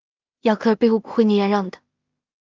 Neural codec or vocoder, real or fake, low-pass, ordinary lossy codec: codec, 16 kHz in and 24 kHz out, 0.4 kbps, LongCat-Audio-Codec, two codebook decoder; fake; 7.2 kHz; Opus, 32 kbps